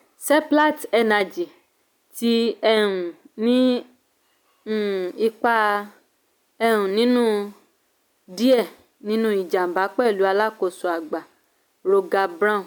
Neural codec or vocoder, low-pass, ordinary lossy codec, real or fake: none; none; none; real